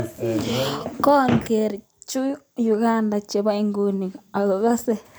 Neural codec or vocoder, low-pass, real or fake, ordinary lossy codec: none; none; real; none